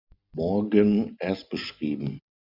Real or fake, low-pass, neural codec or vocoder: real; 5.4 kHz; none